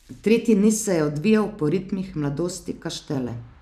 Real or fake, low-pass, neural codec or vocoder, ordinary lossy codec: fake; 14.4 kHz; vocoder, 44.1 kHz, 128 mel bands every 256 samples, BigVGAN v2; none